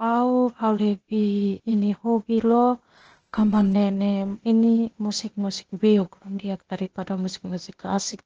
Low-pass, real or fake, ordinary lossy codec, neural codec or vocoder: 7.2 kHz; fake; Opus, 16 kbps; codec, 16 kHz, 0.8 kbps, ZipCodec